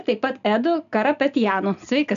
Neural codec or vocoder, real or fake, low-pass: none; real; 7.2 kHz